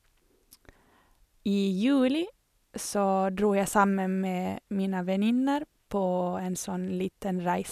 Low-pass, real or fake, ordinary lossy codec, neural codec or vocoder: 14.4 kHz; real; none; none